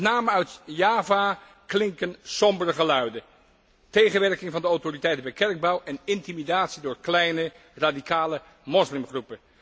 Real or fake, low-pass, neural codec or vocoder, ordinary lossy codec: real; none; none; none